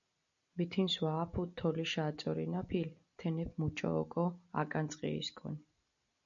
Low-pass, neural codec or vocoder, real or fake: 7.2 kHz; none; real